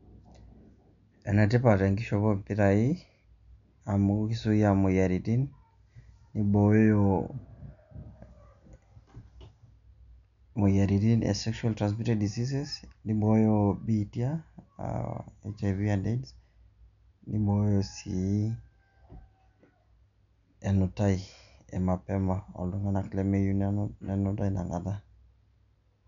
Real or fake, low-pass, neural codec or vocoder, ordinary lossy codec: real; 7.2 kHz; none; none